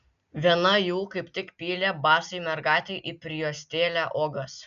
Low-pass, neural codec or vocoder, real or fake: 7.2 kHz; none; real